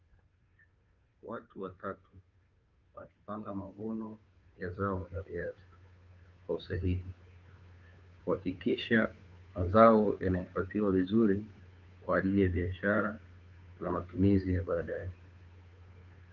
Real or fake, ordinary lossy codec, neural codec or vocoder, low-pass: fake; Opus, 24 kbps; codec, 16 kHz, 2 kbps, FunCodec, trained on Chinese and English, 25 frames a second; 7.2 kHz